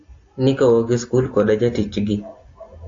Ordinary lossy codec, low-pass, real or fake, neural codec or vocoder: AAC, 48 kbps; 7.2 kHz; real; none